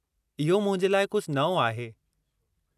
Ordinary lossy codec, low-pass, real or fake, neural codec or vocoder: none; 14.4 kHz; real; none